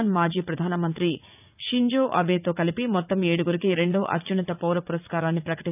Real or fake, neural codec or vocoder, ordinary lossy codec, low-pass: fake; vocoder, 44.1 kHz, 80 mel bands, Vocos; none; 3.6 kHz